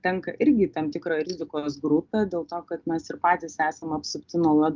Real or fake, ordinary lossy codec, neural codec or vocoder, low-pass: real; Opus, 24 kbps; none; 7.2 kHz